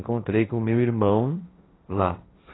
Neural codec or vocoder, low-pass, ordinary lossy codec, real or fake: codec, 16 kHz, 1.1 kbps, Voila-Tokenizer; 7.2 kHz; AAC, 16 kbps; fake